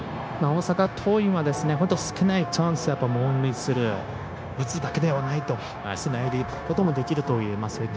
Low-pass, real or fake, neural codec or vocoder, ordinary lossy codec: none; fake; codec, 16 kHz, 0.9 kbps, LongCat-Audio-Codec; none